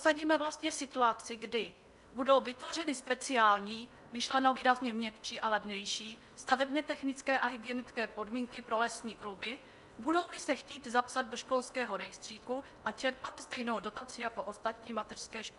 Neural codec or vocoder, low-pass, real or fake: codec, 16 kHz in and 24 kHz out, 0.8 kbps, FocalCodec, streaming, 65536 codes; 10.8 kHz; fake